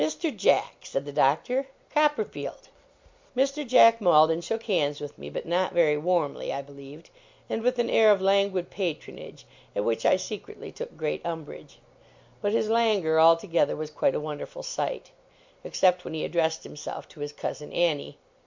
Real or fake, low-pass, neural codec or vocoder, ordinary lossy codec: real; 7.2 kHz; none; MP3, 64 kbps